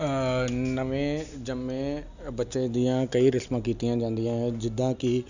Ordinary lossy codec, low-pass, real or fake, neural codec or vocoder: none; 7.2 kHz; real; none